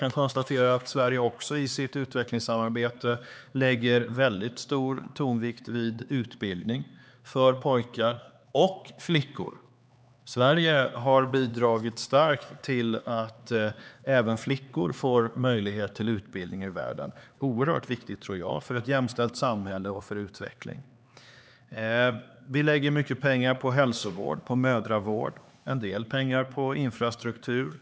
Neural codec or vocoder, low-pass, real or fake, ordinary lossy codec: codec, 16 kHz, 4 kbps, X-Codec, HuBERT features, trained on LibriSpeech; none; fake; none